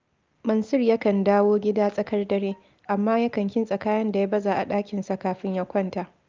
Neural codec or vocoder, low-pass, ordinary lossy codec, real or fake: none; 7.2 kHz; Opus, 24 kbps; real